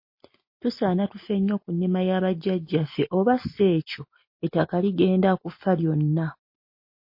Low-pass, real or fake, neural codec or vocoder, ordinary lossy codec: 5.4 kHz; real; none; MP3, 32 kbps